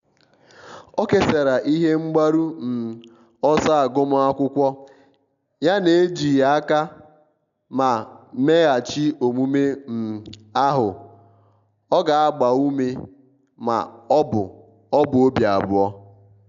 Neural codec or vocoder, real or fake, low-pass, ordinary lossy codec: none; real; 7.2 kHz; none